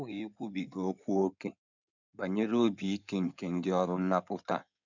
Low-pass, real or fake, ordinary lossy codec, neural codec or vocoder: 7.2 kHz; fake; none; codec, 16 kHz in and 24 kHz out, 2.2 kbps, FireRedTTS-2 codec